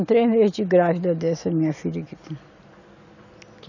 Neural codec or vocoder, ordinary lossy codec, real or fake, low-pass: none; none; real; 7.2 kHz